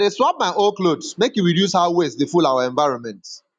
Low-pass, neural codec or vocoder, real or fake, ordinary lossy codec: 9.9 kHz; none; real; none